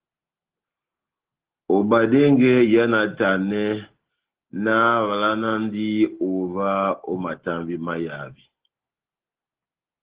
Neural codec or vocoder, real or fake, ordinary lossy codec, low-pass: none; real; Opus, 16 kbps; 3.6 kHz